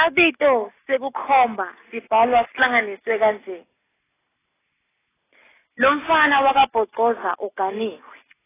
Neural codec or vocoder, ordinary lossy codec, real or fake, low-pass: none; AAC, 16 kbps; real; 3.6 kHz